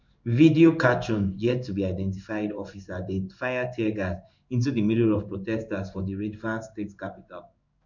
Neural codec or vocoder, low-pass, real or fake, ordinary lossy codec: codec, 16 kHz in and 24 kHz out, 1 kbps, XY-Tokenizer; 7.2 kHz; fake; none